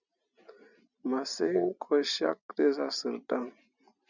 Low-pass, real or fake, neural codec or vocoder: 7.2 kHz; real; none